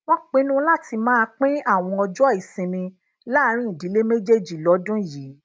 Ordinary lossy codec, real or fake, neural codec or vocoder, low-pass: none; real; none; none